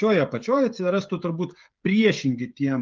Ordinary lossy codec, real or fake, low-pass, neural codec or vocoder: Opus, 24 kbps; fake; 7.2 kHz; codec, 16 kHz, 16 kbps, FreqCodec, smaller model